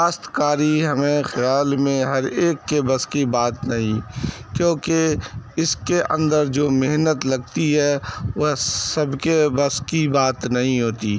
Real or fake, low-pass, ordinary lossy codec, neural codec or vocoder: real; none; none; none